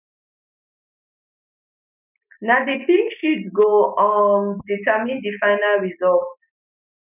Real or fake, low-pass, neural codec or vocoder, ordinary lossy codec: real; 3.6 kHz; none; none